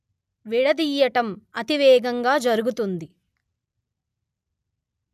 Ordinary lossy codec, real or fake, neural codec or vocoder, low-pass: none; real; none; 14.4 kHz